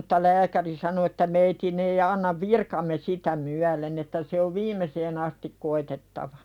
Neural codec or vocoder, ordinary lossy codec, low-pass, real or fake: none; none; 19.8 kHz; real